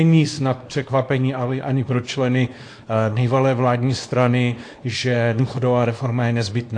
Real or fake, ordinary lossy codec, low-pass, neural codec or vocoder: fake; AAC, 48 kbps; 9.9 kHz; codec, 24 kHz, 0.9 kbps, WavTokenizer, small release